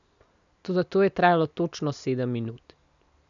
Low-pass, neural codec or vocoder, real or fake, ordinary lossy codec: 7.2 kHz; none; real; none